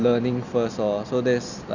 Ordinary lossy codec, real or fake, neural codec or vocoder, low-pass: none; real; none; 7.2 kHz